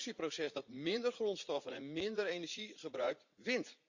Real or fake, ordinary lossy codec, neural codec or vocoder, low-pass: fake; Opus, 64 kbps; vocoder, 44.1 kHz, 80 mel bands, Vocos; 7.2 kHz